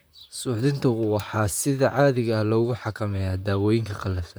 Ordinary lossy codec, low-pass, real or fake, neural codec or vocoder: none; none; fake; vocoder, 44.1 kHz, 128 mel bands, Pupu-Vocoder